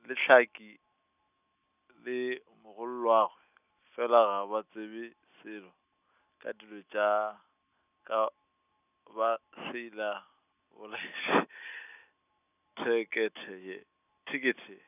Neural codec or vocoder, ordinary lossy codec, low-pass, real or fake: none; none; 3.6 kHz; real